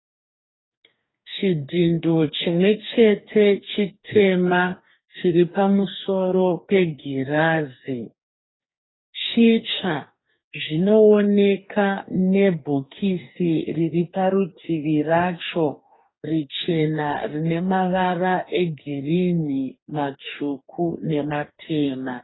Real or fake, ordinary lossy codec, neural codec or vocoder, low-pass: fake; AAC, 16 kbps; codec, 44.1 kHz, 2.6 kbps, DAC; 7.2 kHz